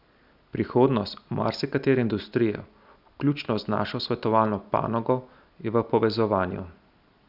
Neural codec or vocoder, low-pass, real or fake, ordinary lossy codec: none; 5.4 kHz; real; none